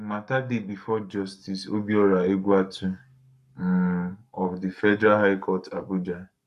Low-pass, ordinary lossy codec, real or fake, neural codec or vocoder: 14.4 kHz; none; fake; codec, 44.1 kHz, 7.8 kbps, Pupu-Codec